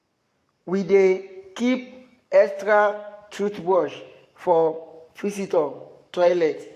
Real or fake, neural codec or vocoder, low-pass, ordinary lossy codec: fake; codec, 44.1 kHz, 7.8 kbps, Pupu-Codec; 14.4 kHz; AAC, 64 kbps